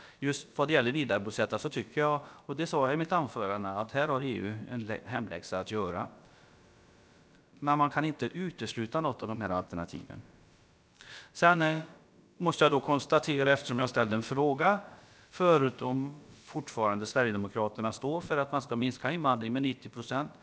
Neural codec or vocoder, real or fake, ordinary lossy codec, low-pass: codec, 16 kHz, about 1 kbps, DyCAST, with the encoder's durations; fake; none; none